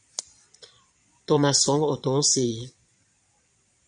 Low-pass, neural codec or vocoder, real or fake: 9.9 kHz; vocoder, 22.05 kHz, 80 mel bands, Vocos; fake